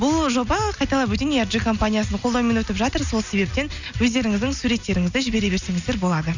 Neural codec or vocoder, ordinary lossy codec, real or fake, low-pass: none; none; real; 7.2 kHz